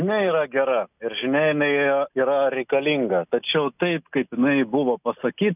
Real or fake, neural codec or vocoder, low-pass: real; none; 3.6 kHz